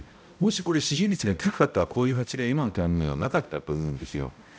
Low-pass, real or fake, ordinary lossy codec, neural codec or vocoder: none; fake; none; codec, 16 kHz, 0.5 kbps, X-Codec, HuBERT features, trained on balanced general audio